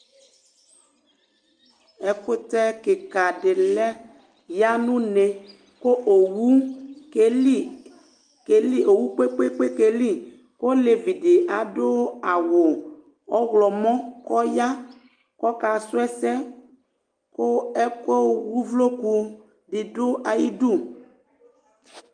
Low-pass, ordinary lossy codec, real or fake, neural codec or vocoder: 9.9 kHz; Opus, 32 kbps; real; none